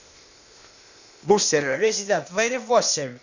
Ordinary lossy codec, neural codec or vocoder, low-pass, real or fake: none; codec, 16 kHz, 0.8 kbps, ZipCodec; 7.2 kHz; fake